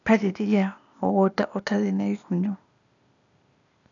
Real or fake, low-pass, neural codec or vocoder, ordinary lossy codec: fake; 7.2 kHz; codec, 16 kHz, 0.8 kbps, ZipCodec; none